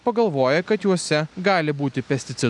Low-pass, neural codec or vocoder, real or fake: 10.8 kHz; none; real